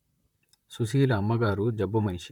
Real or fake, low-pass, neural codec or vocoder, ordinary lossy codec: fake; 19.8 kHz; vocoder, 44.1 kHz, 128 mel bands, Pupu-Vocoder; none